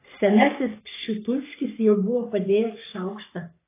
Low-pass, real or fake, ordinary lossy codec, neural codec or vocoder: 3.6 kHz; fake; AAC, 24 kbps; codec, 44.1 kHz, 3.4 kbps, Pupu-Codec